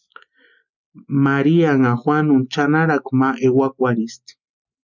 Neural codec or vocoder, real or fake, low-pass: none; real; 7.2 kHz